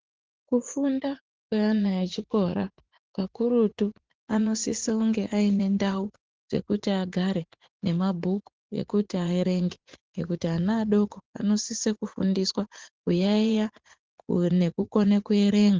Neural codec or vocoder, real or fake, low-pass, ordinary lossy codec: vocoder, 44.1 kHz, 80 mel bands, Vocos; fake; 7.2 kHz; Opus, 16 kbps